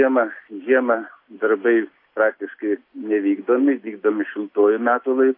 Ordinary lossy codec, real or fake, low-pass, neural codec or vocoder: AAC, 32 kbps; real; 5.4 kHz; none